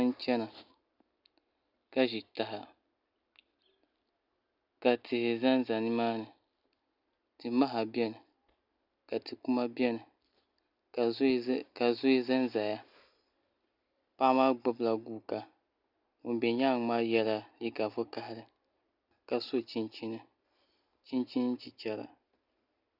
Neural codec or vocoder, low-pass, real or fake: none; 5.4 kHz; real